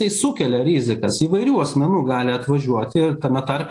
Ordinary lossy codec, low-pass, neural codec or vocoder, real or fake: AAC, 48 kbps; 10.8 kHz; none; real